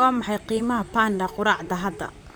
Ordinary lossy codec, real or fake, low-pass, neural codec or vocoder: none; fake; none; vocoder, 44.1 kHz, 128 mel bands, Pupu-Vocoder